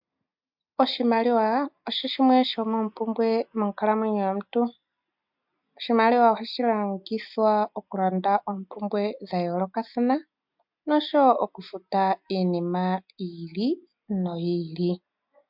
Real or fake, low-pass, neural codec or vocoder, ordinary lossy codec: real; 5.4 kHz; none; MP3, 48 kbps